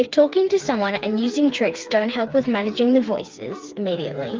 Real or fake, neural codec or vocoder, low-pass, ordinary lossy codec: fake; codec, 16 kHz, 4 kbps, FreqCodec, smaller model; 7.2 kHz; Opus, 24 kbps